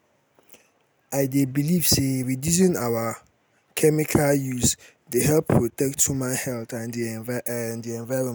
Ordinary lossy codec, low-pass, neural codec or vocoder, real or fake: none; none; none; real